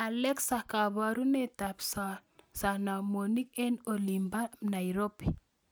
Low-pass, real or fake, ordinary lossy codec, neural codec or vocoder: none; real; none; none